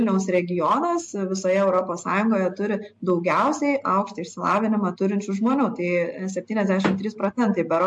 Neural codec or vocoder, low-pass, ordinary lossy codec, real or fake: none; 10.8 kHz; MP3, 48 kbps; real